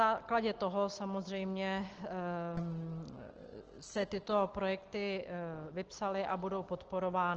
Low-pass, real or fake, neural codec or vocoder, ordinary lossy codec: 7.2 kHz; real; none; Opus, 16 kbps